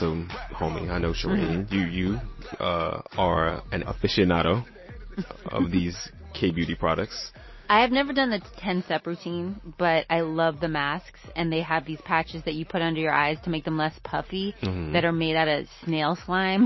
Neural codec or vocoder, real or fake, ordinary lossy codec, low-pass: none; real; MP3, 24 kbps; 7.2 kHz